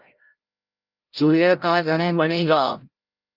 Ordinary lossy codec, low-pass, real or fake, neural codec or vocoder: Opus, 32 kbps; 5.4 kHz; fake; codec, 16 kHz, 0.5 kbps, FreqCodec, larger model